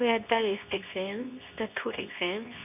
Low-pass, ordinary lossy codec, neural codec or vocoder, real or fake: 3.6 kHz; none; codec, 24 kHz, 0.9 kbps, WavTokenizer, medium speech release version 2; fake